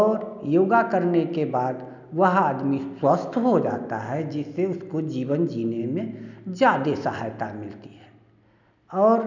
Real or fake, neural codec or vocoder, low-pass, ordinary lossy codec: real; none; 7.2 kHz; none